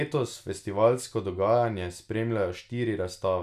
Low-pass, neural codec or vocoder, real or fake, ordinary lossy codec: 14.4 kHz; none; real; none